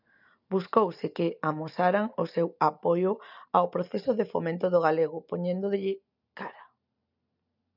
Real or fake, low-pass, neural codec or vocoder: real; 5.4 kHz; none